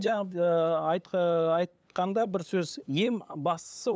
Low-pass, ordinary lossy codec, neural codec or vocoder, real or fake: none; none; codec, 16 kHz, 8 kbps, FunCodec, trained on LibriTTS, 25 frames a second; fake